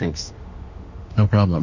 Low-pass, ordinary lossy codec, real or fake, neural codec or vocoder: 7.2 kHz; Opus, 64 kbps; fake; autoencoder, 48 kHz, 32 numbers a frame, DAC-VAE, trained on Japanese speech